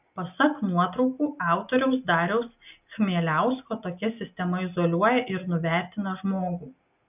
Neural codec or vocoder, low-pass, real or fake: none; 3.6 kHz; real